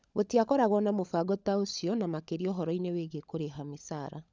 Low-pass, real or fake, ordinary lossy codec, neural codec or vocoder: none; fake; none; codec, 16 kHz, 16 kbps, FunCodec, trained on LibriTTS, 50 frames a second